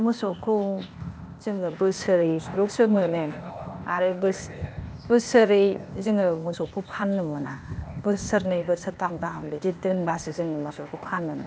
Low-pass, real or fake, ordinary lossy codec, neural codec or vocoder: none; fake; none; codec, 16 kHz, 0.8 kbps, ZipCodec